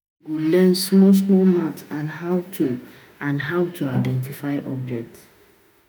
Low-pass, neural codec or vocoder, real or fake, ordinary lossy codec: none; autoencoder, 48 kHz, 32 numbers a frame, DAC-VAE, trained on Japanese speech; fake; none